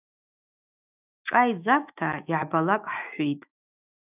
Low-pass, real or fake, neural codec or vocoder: 3.6 kHz; fake; autoencoder, 48 kHz, 128 numbers a frame, DAC-VAE, trained on Japanese speech